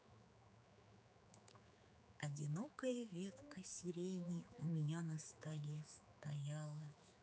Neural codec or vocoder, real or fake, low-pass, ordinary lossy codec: codec, 16 kHz, 4 kbps, X-Codec, HuBERT features, trained on general audio; fake; none; none